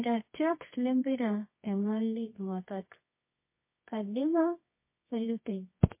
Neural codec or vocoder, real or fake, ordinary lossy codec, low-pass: codec, 24 kHz, 0.9 kbps, WavTokenizer, medium music audio release; fake; MP3, 24 kbps; 3.6 kHz